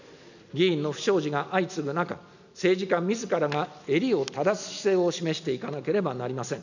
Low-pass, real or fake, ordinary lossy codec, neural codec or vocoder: 7.2 kHz; real; none; none